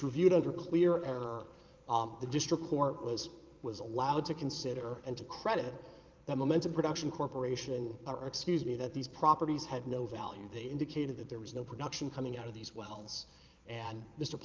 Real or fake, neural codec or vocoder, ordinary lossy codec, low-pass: fake; vocoder, 22.05 kHz, 80 mel bands, Vocos; Opus, 32 kbps; 7.2 kHz